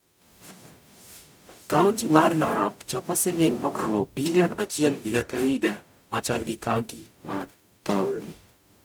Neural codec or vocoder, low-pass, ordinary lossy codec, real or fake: codec, 44.1 kHz, 0.9 kbps, DAC; none; none; fake